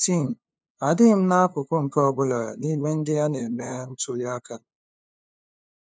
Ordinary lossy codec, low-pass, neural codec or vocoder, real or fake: none; none; codec, 16 kHz, 2 kbps, FunCodec, trained on LibriTTS, 25 frames a second; fake